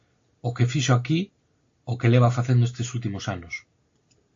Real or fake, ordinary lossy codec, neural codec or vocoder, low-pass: real; AAC, 48 kbps; none; 7.2 kHz